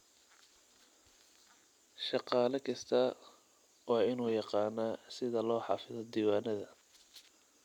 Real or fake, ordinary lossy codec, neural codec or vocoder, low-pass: real; none; none; 19.8 kHz